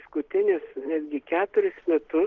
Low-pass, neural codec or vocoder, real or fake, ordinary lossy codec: 7.2 kHz; none; real; Opus, 16 kbps